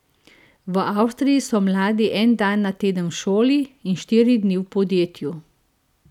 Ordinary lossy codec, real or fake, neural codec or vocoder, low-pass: none; real; none; 19.8 kHz